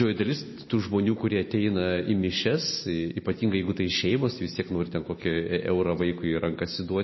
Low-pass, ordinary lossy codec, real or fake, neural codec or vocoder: 7.2 kHz; MP3, 24 kbps; real; none